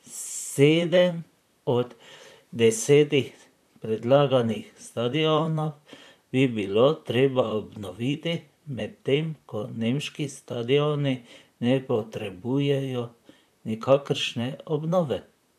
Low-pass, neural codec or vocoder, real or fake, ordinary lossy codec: 14.4 kHz; vocoder, 44.1 kHz, 128 mel bands, Pupu-Vocoder; fake; none